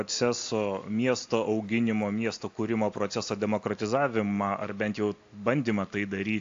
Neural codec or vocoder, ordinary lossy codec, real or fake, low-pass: none; AAC, 48 kbps; real; 7.2 kHz